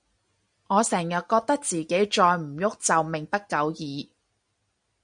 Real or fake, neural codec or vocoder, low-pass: real; none; 9.9 kHz